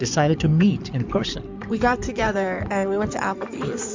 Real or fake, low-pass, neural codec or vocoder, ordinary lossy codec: fake; 7.2 kHz; codec, 16 kHz in and 24 kHz out, 2.2 kbps, FireRedTTS-2 codec; MP3, 64 kbps